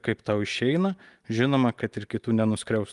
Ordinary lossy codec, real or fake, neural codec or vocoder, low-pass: Opus, 32 kbps; real; none; 10.8 kHz